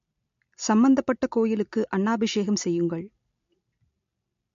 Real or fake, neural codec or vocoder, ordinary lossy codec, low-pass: real; none; MP3, 48 kbps; 7.2 kHz